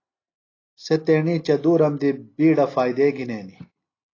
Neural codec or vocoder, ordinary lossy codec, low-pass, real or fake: none; AAC, 32 kbps; 7.2 kHz; real